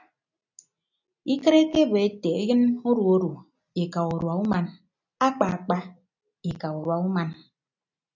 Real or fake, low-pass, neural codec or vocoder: real; 7.2 kHz; none